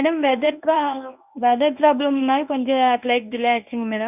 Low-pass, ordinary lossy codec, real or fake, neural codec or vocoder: 3.6 kHz; none; fake; codec, 24 kHz, 0.9 kbps, WavTokenizer, medium speech release version 1